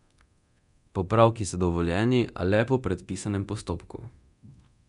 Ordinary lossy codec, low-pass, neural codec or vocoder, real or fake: none; 10.8 kHz; codec, 24 kHz, 0.9 kbps, DualCodec; fake